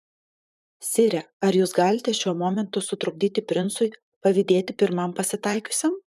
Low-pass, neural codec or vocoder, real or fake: 14.4 kHz; vocoder, 44.1 kHz, 128 mel bands, Pupu-Vocoder; fake